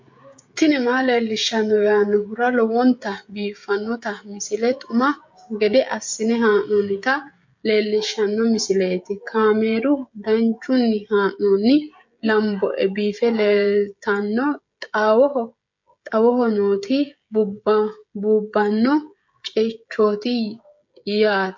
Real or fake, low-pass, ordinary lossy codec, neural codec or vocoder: fake; 7.2 kHz; MP3, 48 kbps; codec, 16 kHz, 16 kbps, FreqCodec, smaller model